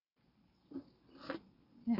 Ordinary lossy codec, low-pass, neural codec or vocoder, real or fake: none; 5.4 kHz; none; real